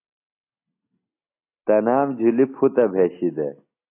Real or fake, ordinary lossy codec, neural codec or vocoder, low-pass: real; AAC, 24 kbps; none; 3.6 kHz